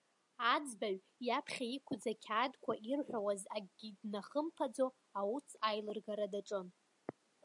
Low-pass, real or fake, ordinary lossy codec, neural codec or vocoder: 10.8 kHz; real; MP3, 96 kbps; none